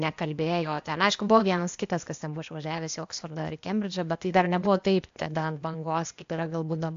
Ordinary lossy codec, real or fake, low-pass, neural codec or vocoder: AAC, 64 kbps; fake; 7.2 kHz; codec, 16 kHz, 0.8 kbps, ZipCodec